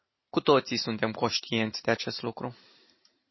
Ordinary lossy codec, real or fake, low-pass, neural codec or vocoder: MP3, 24 kbps; real; 7.2 kHz; none